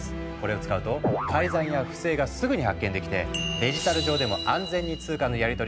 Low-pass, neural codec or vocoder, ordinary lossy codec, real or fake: none; none; none; real